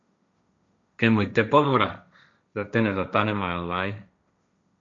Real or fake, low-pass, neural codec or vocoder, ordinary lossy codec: fake; 7.2 kHz; codec, 16 kHz, 1.1 kbps, Voila-Tokenizer; MP3, 64 kbps